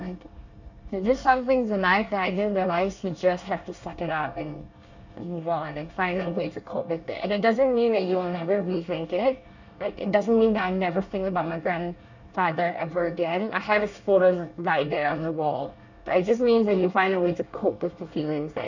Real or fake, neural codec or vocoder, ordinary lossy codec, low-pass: fake; codec, 24 kHz, 1 kbps, SNAC; none; 7.2 kHz